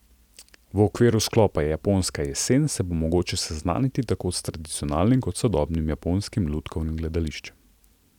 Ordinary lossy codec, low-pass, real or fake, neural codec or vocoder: none; 19.8 kHz; real; none